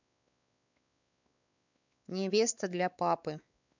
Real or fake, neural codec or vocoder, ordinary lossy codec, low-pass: fake; codec, 16 kHz, 4 kbps, X-Codec, WavLM features, trained on Multilingual LibriSpeech; none; 7.2 kHz